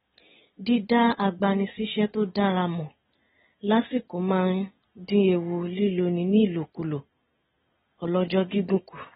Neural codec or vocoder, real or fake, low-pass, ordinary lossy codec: none; real; 7.2 kHz; AAC, 16 kbps